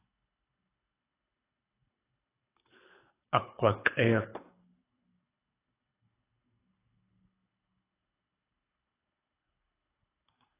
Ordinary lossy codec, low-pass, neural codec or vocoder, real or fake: AAC, 16 kbps; 3.6 kHz; codec, 24 kHz, 6 kbps, HILCodec; fake